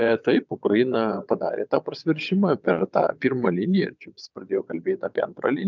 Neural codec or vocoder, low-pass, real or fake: vocoder, 22.05 kHz, 80 mel bands, WaveNeXt; 7.2 kHz; fake